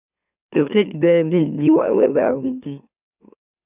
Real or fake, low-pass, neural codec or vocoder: fake; 3.6 kHz; autoencoder, 44.1 kHz, a latent of 192 numbers a frame, MeloTTS